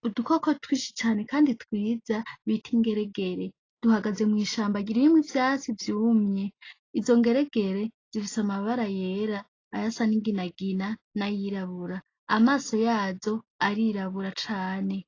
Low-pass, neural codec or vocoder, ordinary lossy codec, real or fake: 7.2 kHz; none; AAC, 32 kbps; real